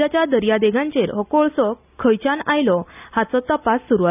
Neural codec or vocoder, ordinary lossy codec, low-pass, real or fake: none; none; 3.6 kHz; real